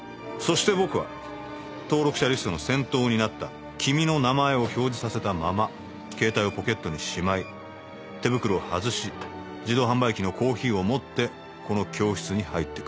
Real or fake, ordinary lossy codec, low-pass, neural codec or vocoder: real; none; none; none